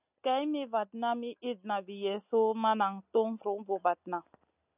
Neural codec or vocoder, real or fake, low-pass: none; real; 3.6 kHz